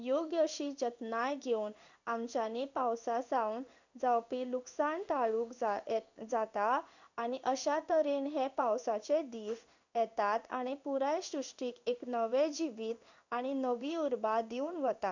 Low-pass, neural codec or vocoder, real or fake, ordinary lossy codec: 7.2 kHz; codec, 16 kHz in and 24 kHz out, 1 kbps, XY-Tokenizer; fake; none